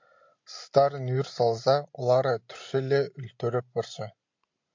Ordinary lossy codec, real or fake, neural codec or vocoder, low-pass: MP3, 48 kbps; real; none; 7.2 kHz